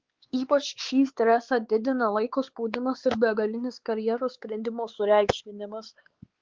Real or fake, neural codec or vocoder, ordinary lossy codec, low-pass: fake; codec, 16 kHz, 4 kbps, X-Codec, WavLM features, trained on Multilingual LibriSpeech; Opus, 16 kbps; 7.2 kHz